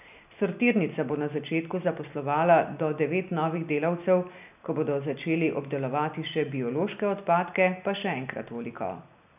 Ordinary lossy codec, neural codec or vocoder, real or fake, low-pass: none; none; real; 3.6 kHz